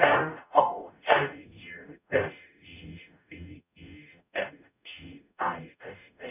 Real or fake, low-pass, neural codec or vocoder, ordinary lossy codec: fake; 3.6 kHz; codec, 44.1 kHz, 0.9 kbps, DAC; none